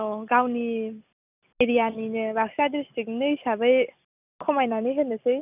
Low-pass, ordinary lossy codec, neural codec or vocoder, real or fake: 3.6 kHz; none; none; real